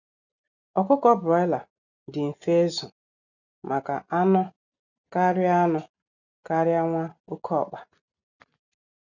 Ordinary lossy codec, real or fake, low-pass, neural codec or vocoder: none; real; 7.2 kHz; none